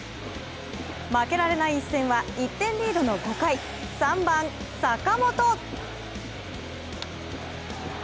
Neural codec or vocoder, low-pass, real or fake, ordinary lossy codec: none; none; real; none